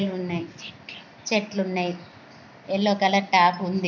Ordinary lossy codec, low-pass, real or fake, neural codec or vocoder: none; 7.2 kHz; real; none